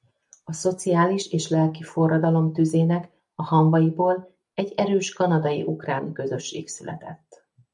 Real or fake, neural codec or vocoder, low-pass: real; none; 10.8 kHz